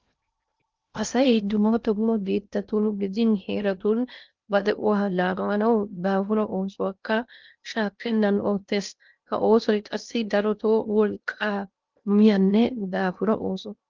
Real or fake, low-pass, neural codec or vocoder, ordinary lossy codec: fake; 7.2 kHz; codec, 16 kHz in and 24 kHz out, 0.6 kbps, FocalCodec, streaming, 2048 codes; Opus, 24 kbps